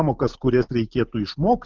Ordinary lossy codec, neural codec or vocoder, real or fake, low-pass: Opus, 24 kbps; none; real; 7.2 kHz